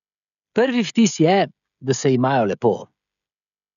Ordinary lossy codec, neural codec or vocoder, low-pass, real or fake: none; codec, 16 kHz, 16 kbps, FreqCodec, smaller model; 7.2 kHz; fake